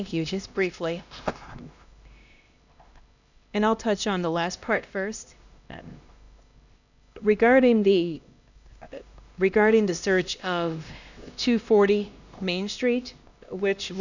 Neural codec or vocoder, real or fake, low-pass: codec, 16 kHz, 1 kbps, X-Codec, HuBERT features, trained on LibriSpeech; fake; 7.2 kHz